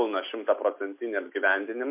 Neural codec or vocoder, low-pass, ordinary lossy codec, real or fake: none; 3.6 kHz; MP3, 32 kbps; real